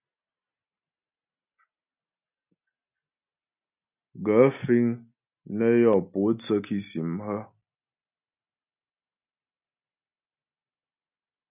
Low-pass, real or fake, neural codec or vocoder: 3.6 kHz; real; none